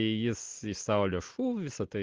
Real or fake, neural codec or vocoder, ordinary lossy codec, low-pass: real; none; Opus, 16 kbps; 7.2 kHz